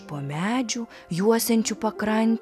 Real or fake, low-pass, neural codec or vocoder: real; 14.4 kHz; none